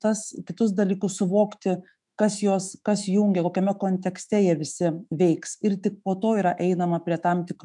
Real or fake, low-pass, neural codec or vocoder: fake; 10.8 kHz; autoencoder, 48 kHz, 128 numbers a frame, DAC-VAE, trained on Japanese speech